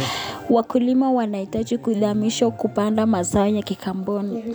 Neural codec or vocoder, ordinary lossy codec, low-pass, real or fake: none; none; none; real